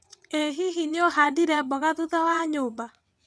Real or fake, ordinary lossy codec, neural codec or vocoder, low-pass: fake; none; vocoder, 22.05 kHz, 80 mel bands, WaveNeXt; none